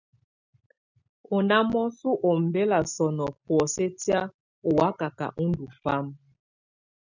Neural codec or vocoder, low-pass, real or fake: none; 7.2 kHz; real